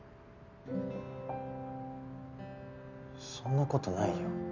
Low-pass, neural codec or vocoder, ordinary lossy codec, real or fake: 7.2 kHz; none; none; real